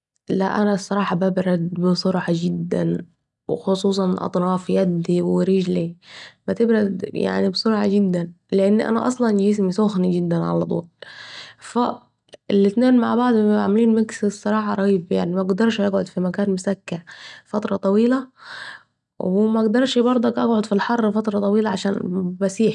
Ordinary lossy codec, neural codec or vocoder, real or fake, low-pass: none; none; real; 10.8 kHz